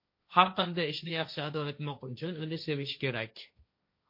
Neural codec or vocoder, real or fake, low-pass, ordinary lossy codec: codec, 16 kHz, 1.1 kbps, Voila-Tokenizer; fake; 5.4 kHz; MP3, 32 kbps